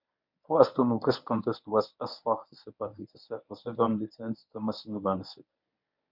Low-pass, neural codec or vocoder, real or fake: 5.4 kHz; codec, 24 kHz, 0.9 kbps, WavTokenizer, medium speech release version 1; fake